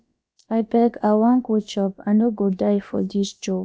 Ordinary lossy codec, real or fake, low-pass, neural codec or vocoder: none; fake; none; codec, 16 kHz, about 1 kbps, DyCAST, with the encoder's durations